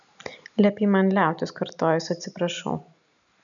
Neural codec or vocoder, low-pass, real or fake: none; 7.2 kHz; real